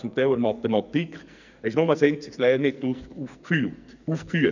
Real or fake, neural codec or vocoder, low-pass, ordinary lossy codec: fake; codec, 44.1 kHz, 2.6 kbps, SNAC; 7.2 kHz; none